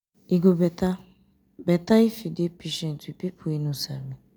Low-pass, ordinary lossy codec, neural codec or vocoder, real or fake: none; none; none; real